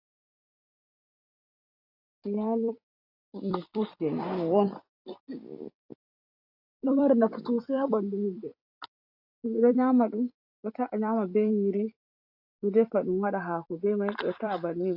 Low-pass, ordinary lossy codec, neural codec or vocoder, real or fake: 5.4 kHz; AAC, 48 kbps; vocoder, 44.1 kHz, 128 mel bands every 256 samples, BigVGAN v2; fake